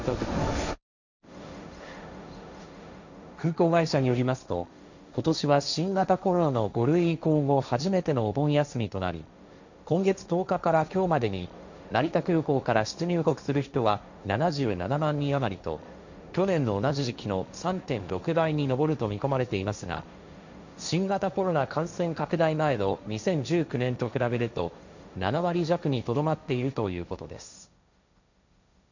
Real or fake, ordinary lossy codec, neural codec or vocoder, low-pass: fake; none; codec, 16 kHz, 1.1 kbps, Voila-Tokenizer; 7.2 kHz